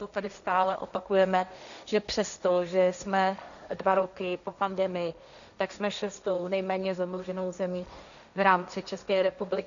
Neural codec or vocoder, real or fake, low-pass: codec, 16 kHz, 1.1 kbps, Voila-Tokenizer; fake; 7.2 kHz